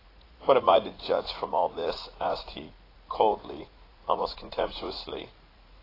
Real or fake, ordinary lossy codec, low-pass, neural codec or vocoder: fake; AAC, 24 kbps; 5.4 kHz; vocoder, 44.1 kHz, 80 mel bands, Vocos